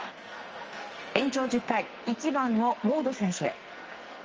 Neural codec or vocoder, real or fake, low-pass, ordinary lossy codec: codec, 44.1 kHz, 2.6 kbps, SNAC; fake; 7.2 kHz; Opus, 24 kbps